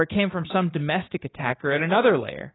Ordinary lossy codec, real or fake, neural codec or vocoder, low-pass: AAC, 16 kbps; fake; codec, 16 kHz, 6 kbps, DAC; 7.2 kHz